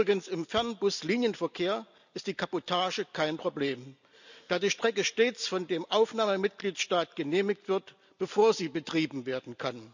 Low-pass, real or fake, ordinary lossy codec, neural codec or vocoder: 7.2 kHz; real; none; none